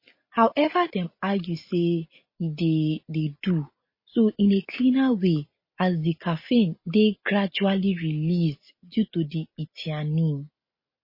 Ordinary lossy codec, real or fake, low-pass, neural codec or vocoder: MP3, 24 kbps; real; 5.4 kHz; none